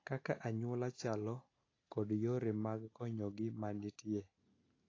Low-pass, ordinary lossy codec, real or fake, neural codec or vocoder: 7.2 kHz; AAC, 32 kbps; real; none